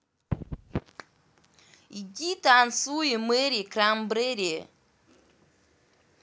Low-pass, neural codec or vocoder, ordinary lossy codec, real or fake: none; none; none; real